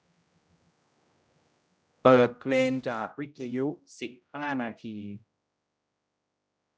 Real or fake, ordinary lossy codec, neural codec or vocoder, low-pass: fake; none; codec, 16 kHz, 0.5 kbps, X-Codec, HuBERT features, trained on general audio; none